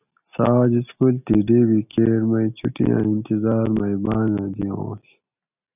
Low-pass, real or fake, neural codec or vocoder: 3.6 kHz; real; none